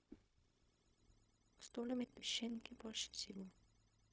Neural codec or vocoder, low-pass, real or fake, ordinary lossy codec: codec, 16 kHz, 0.4 kbps, LongCat-Audio-Codec; none; fake; none